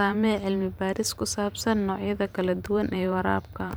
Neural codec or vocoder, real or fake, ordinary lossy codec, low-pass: vocoder, 44.1 kHz, 128 mel bands every 512 samples, BigVGAN v2; fake; none; none